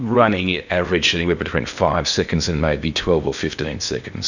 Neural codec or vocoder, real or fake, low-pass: codec, 16 kHz in and 24 kHz out, 0.8 kbps, FocalCodec, streaming, 65536 codes; fake; 7.2 kHz